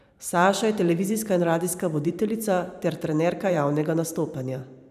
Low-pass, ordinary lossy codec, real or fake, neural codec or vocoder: 14.4 kHz; none; fake; vocoder, 44.1 kHz, 128 mel bands every 512 samples, BigVGAN v2